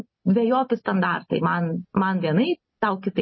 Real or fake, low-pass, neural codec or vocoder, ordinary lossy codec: real; 7.2 kHz; none; MP3, 24 kbps